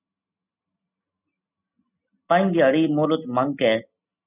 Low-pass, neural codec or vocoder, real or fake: 3.6 kHz; none; real